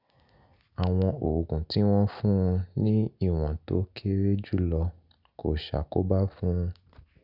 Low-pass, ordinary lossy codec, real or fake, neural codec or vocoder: 5.4 kHz; none; real; none